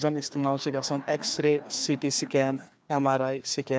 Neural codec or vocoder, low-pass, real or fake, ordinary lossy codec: codec, 16 kHz, 2 kbps, FreqCodec, larger model; none; fake; none